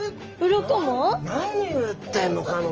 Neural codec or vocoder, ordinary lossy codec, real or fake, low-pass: none; Opus, 24 kbps; real; 7.2 kHz